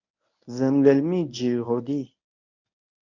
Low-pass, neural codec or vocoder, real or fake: 7.2 kHz; codec, 24 kHz, 0.9 kbps, WavTokenizer, medium speech release version 1; fake